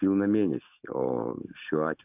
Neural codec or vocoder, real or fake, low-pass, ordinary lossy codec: none; real; 3.6 kHz; AAC, 32 kbps